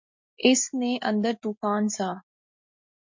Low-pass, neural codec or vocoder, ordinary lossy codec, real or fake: 7.2 kHz; none; MP3, 48 kbps; real